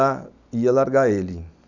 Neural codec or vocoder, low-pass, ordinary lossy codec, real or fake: none; 7.2 kHz; none; real